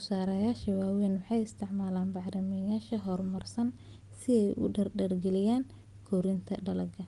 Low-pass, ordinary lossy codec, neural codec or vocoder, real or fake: 10.8 kHz; Opus, 32 kbps; none; real